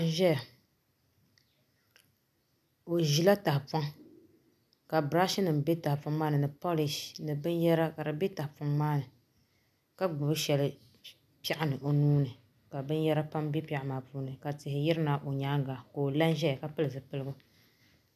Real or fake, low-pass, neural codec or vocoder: real; 14.4 kHz; none